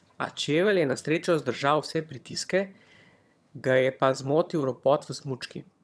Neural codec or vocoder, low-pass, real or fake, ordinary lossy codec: vocoder, 22.05 kHz, 80 mel bands, HiFi-GAN; none; fake; none